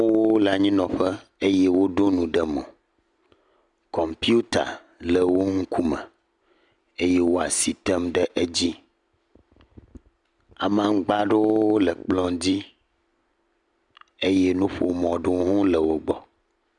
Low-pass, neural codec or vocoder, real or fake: 10.8 kHz; none; real